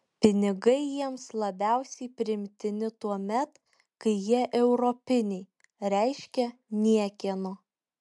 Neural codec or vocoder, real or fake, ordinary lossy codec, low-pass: none; real; MP3, 96 kbps; 10.8 kHz